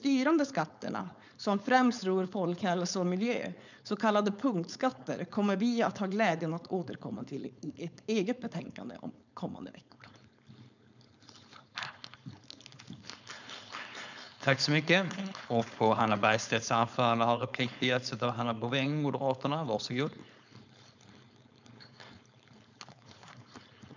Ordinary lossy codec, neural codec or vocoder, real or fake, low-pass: none; codec, 16 kHz, 4.8 kbps, FACodec; fake; 7.2 kHz